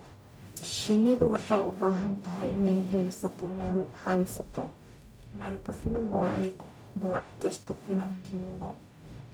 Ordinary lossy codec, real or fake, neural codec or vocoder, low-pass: none; fake; codec, 44.1 kHz, 0.9 kbps, DAC; none